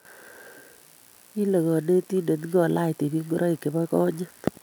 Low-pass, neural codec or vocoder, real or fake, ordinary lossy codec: none; none; real; none